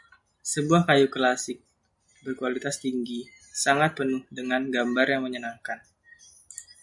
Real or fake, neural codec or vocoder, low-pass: real; none; 10.8 kHz